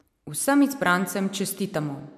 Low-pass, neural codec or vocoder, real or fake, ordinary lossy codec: 14.4 kHz; none; real; MP3, 96 kbps